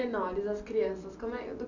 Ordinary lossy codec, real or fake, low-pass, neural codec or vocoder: none; real; 7.2 kHz; none